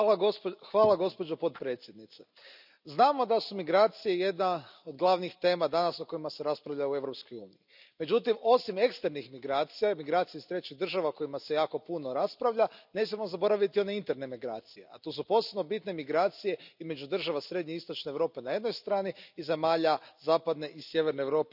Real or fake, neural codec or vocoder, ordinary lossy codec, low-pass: real; none; none; 5.4 kHz